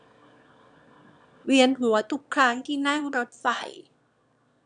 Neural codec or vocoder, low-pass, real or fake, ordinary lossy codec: autoencoder, 22.05 kHz, a latent of 192 numbers a frame, VITS, trained on one speaker; 9.9 kHz; fake; none